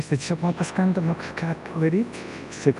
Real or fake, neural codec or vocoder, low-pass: fake; codec, 24 kHz, 0.9 kbps, WavTokenizer, large speech release; 10.8 kHz